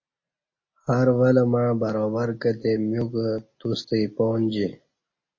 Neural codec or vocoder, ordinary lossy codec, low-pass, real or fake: none; MP3, 32 kbps; 7.2 kHz; real